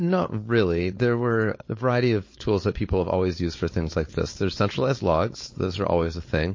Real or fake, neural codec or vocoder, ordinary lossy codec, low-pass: fake; codec, 16 kHz, 4.8 kbps, FACodec; MP3, 32 kbps; 7.2 kHz